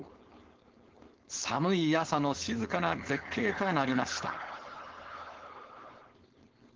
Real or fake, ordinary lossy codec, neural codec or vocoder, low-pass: fake; Opus, 16 kbps; codec, 16 kHz, 4.8 kbps, FACodec; 7.2 kHz